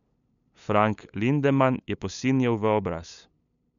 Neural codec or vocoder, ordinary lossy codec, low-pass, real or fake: codec, 16 kHz, 8 kbps, FunCodec, trained on LibriTTS, 25 frames a second; none; 7.2 kHz; fake